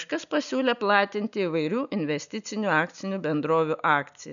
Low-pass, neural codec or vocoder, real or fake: 7.2 kHz; none; real